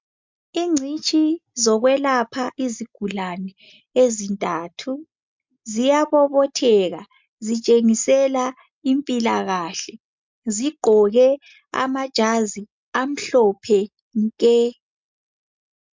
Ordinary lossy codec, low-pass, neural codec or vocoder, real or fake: MP3, 64 kbps; 7.2 kHz; none; real